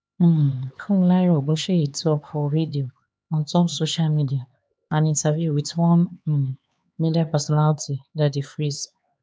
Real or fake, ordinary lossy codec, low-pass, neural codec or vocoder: fake; none; none; codec, 16 kHz, 4 kbps, X-Codec, HuBERT features, trained on LibriSpeech